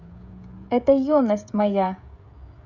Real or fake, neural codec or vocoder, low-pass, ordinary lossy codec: fake; codec, 16 kHz, 16 kbps, FreqCodec, smaller model; 7.2 kHz; none